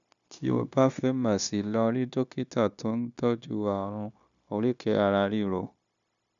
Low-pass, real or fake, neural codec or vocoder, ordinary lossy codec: 7.2 kHz; fake; codec, 16 kHz, 0.9 kbps, LongCat-Audio-Codec; none